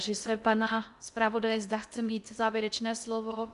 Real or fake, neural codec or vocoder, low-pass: fake; codec, 16 kHz in and 24 kHz out, 0.6 kbps, FocalCodec, streaming, 2048 codes; 10.8 kHz